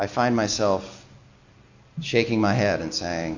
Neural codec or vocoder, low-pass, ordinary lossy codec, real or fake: none; 7.2 kHz; MP3, 48 kbps; real